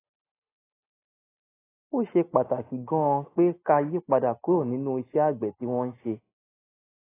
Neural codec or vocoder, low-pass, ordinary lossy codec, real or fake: none; 3.6 kHz; AAC, 16 kbps; real